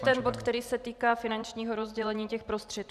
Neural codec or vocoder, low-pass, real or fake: vocoder, 44.1 kHz, 128 mel bands every 512 samples, BigVGAN v2; 14.4 kHz; fake